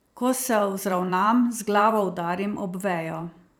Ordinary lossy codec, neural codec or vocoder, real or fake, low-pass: none; vocoder, 44.1 kHz, 128 mel bands every 512 samples, BigVGAN v2; fake; none